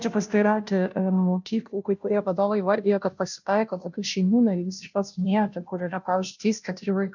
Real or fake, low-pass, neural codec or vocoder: fake; 7.2 kHz; codec, 16 kHz, 0.5 kbps, FunCodec, trained on Chinese and English, 25 frames a second